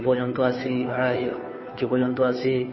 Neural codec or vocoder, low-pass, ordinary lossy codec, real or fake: codec, 16 kHz, 2 kbps, FunCodec, trained on Chinese and English, 25 frames a second; 7.2 kHz; MP3, 24 kbps; fake